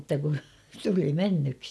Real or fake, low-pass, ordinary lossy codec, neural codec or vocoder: real; none; none; none